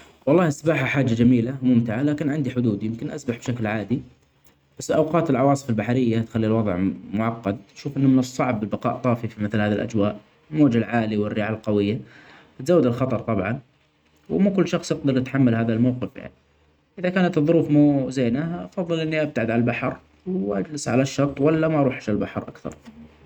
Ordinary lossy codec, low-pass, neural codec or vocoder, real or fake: none; 19.8 kHz; none; real